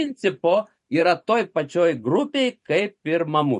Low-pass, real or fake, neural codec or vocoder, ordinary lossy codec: 14.4 kHz; fake; vocoder, 44.1 kHz, 128 mel bands every 512 samples, BigVGAN v2; MP3, 48 kbps